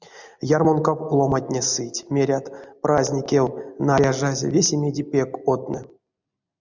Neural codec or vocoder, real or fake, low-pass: none; real; 7.2 kHz